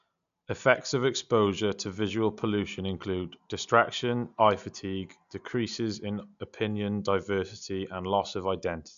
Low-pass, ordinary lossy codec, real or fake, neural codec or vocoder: 7.2 kHz; AAC, 96 kbps; real; none